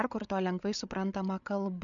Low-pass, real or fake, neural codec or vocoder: 7.2 kHz; fake; codec, 16 kHz, 8 kbps, FreqCodec, larger model